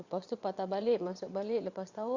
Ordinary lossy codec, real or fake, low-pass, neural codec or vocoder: Opus, 64 kbps; fake; 7.2 kHz; vocoder, 22.05 kHz, 80 mel bands, WaveNeXt